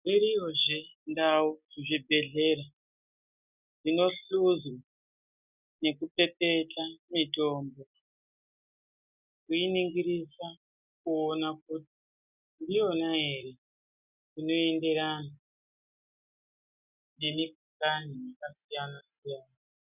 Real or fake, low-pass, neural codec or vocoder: real; 3.6 kHz; none